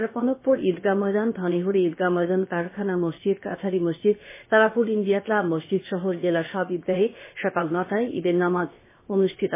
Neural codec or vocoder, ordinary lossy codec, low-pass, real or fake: codec, 16 kHz, 0.7 kbps, FocalCodec; MP3, 16 kbps; 3.6 kHz; fake